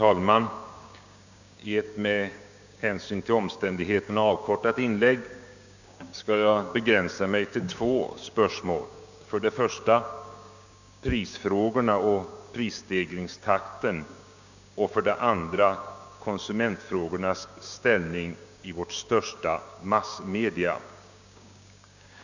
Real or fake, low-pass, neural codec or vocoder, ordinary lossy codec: fake; 7.2 kHz; codec, 16 kHz, 6 kbps, DAC; none